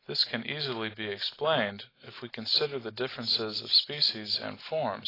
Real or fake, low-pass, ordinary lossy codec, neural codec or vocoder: real; 5.4 kHz; AAC, 24 kbps; none